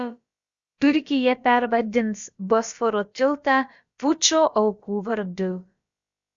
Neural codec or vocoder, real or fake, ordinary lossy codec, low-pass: codec, 16 kHz, about 1 kbps, DyCAST, with the encoder's durations; fake; Opus, 64 kbps; 7.2 kHz